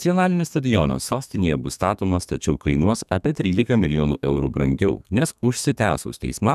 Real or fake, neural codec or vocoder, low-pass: fake; codec, 32 kHz, 1.9 kbps, SNAC; 14.4 kHz